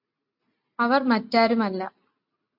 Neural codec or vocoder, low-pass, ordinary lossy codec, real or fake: none; 5.4 kHz; MP3, 48 kbps; real